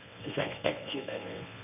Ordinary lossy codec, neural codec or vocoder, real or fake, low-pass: AAC, 32 kbps; codec, 24 kHz, 0.9 kbps, WavTokenizer, medium speech release version 1; fake; 3.6 kHz